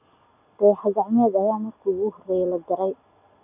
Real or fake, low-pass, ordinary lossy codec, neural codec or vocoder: real; 3.6 kHz; none; none